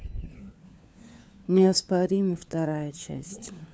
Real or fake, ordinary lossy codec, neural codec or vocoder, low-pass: fake; none; codec, 16 kHz, 4 kbps, FunCodec, trained on LibriTTS, 50 frames a second; none